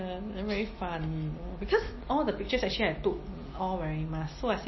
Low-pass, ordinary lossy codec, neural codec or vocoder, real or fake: 7.2 kHz; MP3, 24 kbps; none; real